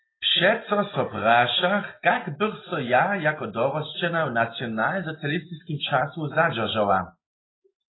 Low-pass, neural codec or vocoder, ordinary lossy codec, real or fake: 7.2 kHz; none; AAC, 16 kbps; real